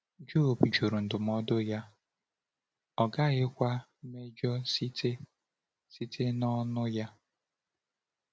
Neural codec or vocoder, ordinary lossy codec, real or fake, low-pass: none; none; real; none